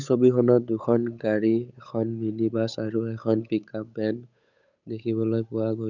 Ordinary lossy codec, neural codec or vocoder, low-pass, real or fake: none; codec, 16 kHz, 8 kbps, FunCodec, trained on Chinese and English, 25 frames a second; 7.2 kHz; fake